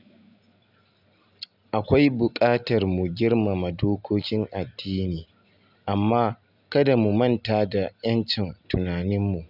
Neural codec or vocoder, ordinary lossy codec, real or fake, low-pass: none; none; real; 5.4 kHz